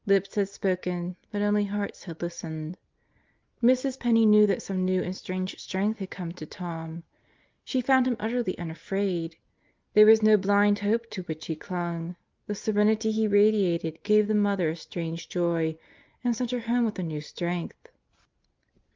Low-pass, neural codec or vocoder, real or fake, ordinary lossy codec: 7.2 kHz; none; real; Opus, 32 kbps